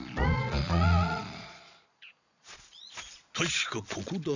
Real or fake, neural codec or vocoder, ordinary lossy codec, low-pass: fake; vocoder, 22.05 kHz, 80 mel bands, Vocos; none; 7.2 kHz